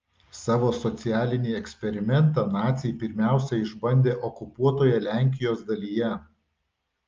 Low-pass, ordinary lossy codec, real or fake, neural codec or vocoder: 7.2 kHz; Opus, 24 kbps; real; none